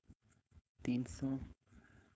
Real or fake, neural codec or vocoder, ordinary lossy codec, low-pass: fake; codec, 16 kHz, 4.8 kbps, FACodec; none; none